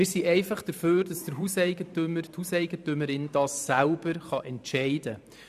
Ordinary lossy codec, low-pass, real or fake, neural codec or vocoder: none; 14.4 kHz; fake; vocoder, 44.1 kHz, 128 mel bands every 512 samples, BigVGAN v2